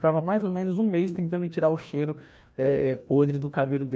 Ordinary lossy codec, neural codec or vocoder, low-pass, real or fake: none; codec, 16 kHz, 1 kbps, FreqCodec, larger model; none; fake